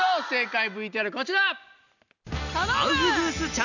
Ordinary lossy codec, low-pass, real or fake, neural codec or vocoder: none; 7.2 kHz; real; none